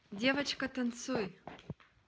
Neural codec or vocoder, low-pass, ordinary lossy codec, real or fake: none; none; none; real